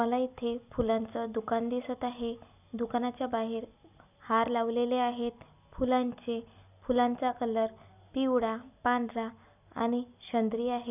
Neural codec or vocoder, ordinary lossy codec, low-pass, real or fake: none; none; 3.6 kHz; real